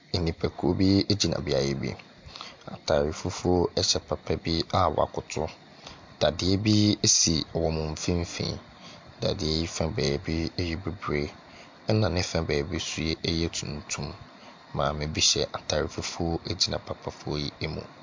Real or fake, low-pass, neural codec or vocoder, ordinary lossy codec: real; 7.2 kHz; none; MP3, 64 kbps